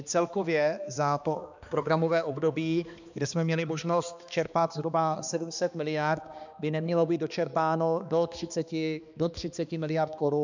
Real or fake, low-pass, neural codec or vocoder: fake; 7.2 kHz; codec, 16 kHz, 2 kbps, X-Codec, HuBERT features, trained on balanced general audio